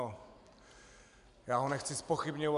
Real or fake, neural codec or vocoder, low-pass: real; none; 10.8 kHz